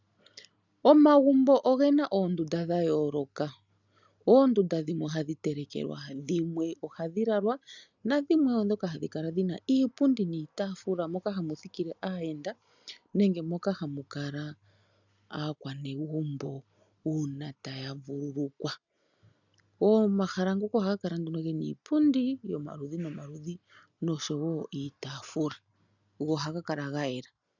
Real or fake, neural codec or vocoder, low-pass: real; none; 7.2 kHz